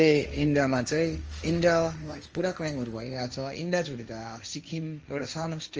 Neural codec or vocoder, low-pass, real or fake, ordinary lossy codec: codec, 16 kHz, 1.1 kbps, Voila-Tokenizer; 7.2 kHz; fake; Opus, 24 kbps